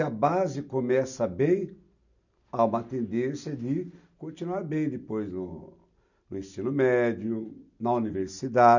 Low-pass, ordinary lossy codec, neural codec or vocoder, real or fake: 7.2 kHz; none; none; real